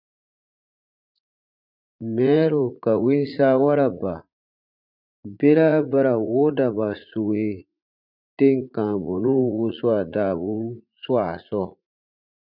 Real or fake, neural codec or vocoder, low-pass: fake; vocoder, 44.1 kHz, 80 mel bands, Vocos; 5.4 kHz